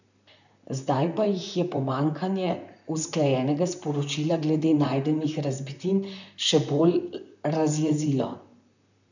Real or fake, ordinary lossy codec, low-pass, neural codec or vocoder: fake; none; 7.2 kHz; vocoder, 44.1 kHz, 128 mel bands, Pupu-Vocoder